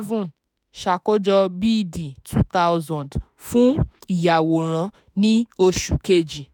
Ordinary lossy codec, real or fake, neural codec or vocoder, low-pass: none; fake; autoencoder, 48 kHz, 32 numbers a frame, DAC-VAE, trained on Japanese speech; 19.8 kHz